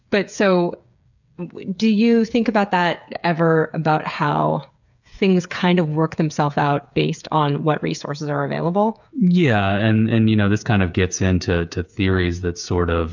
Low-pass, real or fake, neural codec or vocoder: 7.2 kHz; fake; codec, 16 kHz, 8 kbps, FreqCodec, smaller model